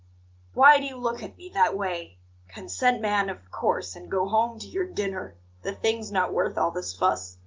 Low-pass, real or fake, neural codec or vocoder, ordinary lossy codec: 7.2 kHz; real; none; Opus, 32 kbps